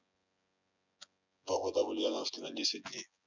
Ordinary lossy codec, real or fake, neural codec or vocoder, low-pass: none; fake; vocoder, 24 kHz, 100 mel bands, Vocos; 7.2 kHz